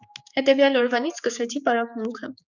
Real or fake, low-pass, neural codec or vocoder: fake; 7.2 kHz; codec, 16 kHz, 4 kbps, X-Codec, HuBERT features, trained on general audio